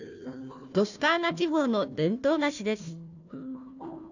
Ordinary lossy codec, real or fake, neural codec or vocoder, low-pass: none; fake; codec, 16 kHz, 1 kbps, FunCodec, trained on Chinese and English, 50 frames a second; 7.2 kHz